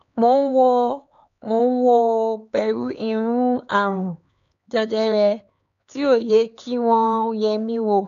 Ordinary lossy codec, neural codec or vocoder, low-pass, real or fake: none; codec, 16 kHz, 4 kbps, X-Codec, HuBERT features, trained on LibriSpeech; 7.2 kHz; fake